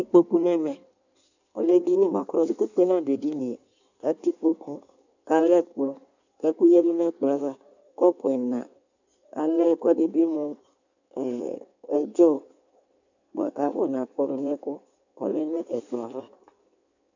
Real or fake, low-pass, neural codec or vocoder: fake; 7.2 kHz; codec, 16 kHz in and 24 kHz out, 1.1 kbps, FireRedTTS-2 codec